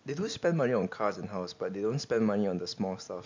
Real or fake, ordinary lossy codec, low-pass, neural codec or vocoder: real; MP3, 64 kbps; 7.2 kHz; none